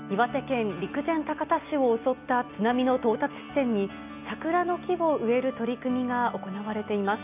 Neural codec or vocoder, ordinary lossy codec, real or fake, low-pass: none; none; real; 3.6 kHz